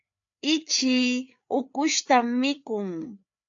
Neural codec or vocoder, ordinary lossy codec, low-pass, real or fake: codec, 16 kHz, 4 kbps, FreqCodec, larger model; AAC, 64 kbps; 7.2 kHz; fake